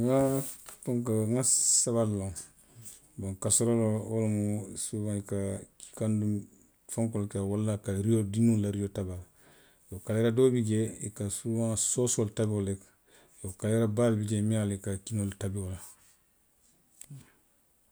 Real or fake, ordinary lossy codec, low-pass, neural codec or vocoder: real; none; none; none